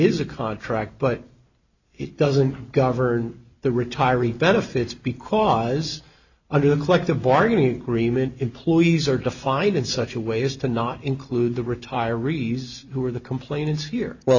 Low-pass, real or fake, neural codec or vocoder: 7.2 kHz; real; none